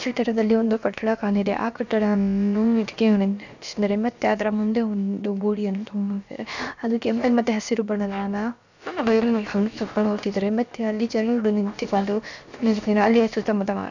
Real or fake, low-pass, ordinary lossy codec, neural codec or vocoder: fake; 7.2 kHz; none; codec, 16 kHz, about 1 kbps, DyCAST, with the encoder's durations